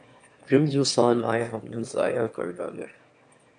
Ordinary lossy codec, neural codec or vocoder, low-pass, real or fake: MP3, 64 kbps; autoencoder, 22.05 kHz, a latent of 192 numbers a frame, VITS, trained on one speaker; 9.9 kHz; fake